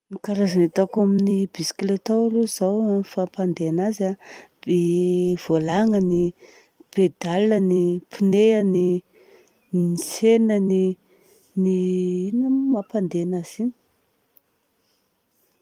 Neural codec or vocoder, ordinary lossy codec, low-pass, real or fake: vocoder, 44.1 kHz, 128 mel bands every 256 samples, BigVGAN v2; Opus, 32 kbps; 19.8 kHz; fake